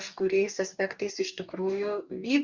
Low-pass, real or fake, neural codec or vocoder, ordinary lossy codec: 7.2 kHz; fake; codec, 44.1 kHz, 2.6 kbps, DAC; Opus, 64 kbps